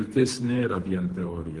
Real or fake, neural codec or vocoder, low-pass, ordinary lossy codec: fake; codec, 24 kHz, 3 kbps, HILCodec; 10.8 kHz; Opus, 24 kbps